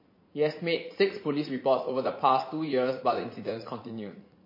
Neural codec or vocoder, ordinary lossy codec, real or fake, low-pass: vocoder, 44.1 kHz, 80 mel bands, Vocos; MP3, 24 kbps; fake; 5.4 kHz